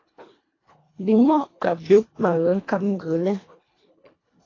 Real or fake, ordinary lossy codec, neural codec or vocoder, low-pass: fake; AAC, 32 kbps; codec, 24 kHz, 1.5 kbps, HILCodec; 7.2 kHz